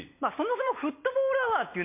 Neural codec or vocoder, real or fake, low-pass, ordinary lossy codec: none; real; 3.6 kHz; MP3, 24 kbps